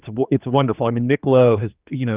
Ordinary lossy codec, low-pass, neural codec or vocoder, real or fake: Opus, 24 kbps; 3.6 kHz; codec, 16 kHz, 2 kbps, X-Codec, HuBERT features, trained on general audio; fake